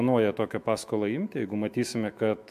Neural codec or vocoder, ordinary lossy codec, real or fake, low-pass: autoencoder, 48 kHz, 128 numbers a frame, DAC-VAE, trained on Japanese speech; MP3, 96 kbps; fake; 14.4 kHz